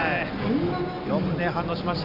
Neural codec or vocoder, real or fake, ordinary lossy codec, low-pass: none; real; none; 5.4 kHz